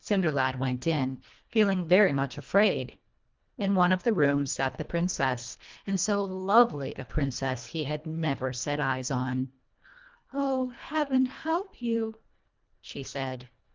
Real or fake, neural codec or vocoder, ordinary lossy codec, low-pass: fake; codec, 24 kHz, 1.5 kbps, HILCodec; Opus, 24 kbps; 7.2 kHz